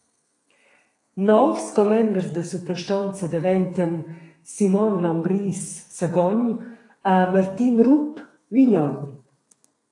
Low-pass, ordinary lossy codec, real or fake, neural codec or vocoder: 10.8 kHz; AAC, 48 kbps; fake; codec, 32 kHz, 1.9 kbps, SNAC